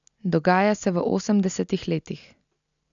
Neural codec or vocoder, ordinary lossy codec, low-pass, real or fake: none; none; 7.2 kHz; real